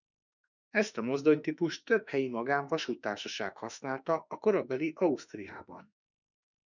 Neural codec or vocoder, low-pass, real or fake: autoencoder, 48 kHz, 32 numbers a frame, DAC-VAE, trained on Japanese speech; 7.2 kHz; fake